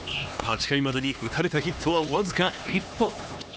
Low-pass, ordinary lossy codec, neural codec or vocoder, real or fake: none; none; codec, 16 kHz, 2 kbps, X-Codec, HuBERT features, trained on LibriSpeech; fake